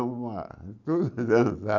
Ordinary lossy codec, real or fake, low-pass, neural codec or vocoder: none; real; 7.2 kHz; none